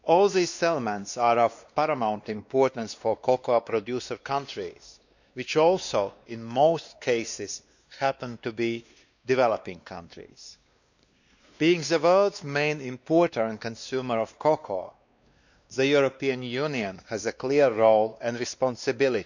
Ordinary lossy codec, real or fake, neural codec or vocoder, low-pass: none; fake; codec, 16 kHz, 2 kbps, X-Codec, WavLM features, trained on Multilingual LibriSpeech; 7.2 kHz